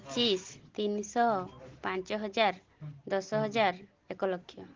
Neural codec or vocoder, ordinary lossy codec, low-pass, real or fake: none; Opus, 16 kbps; 7.2 kHz; real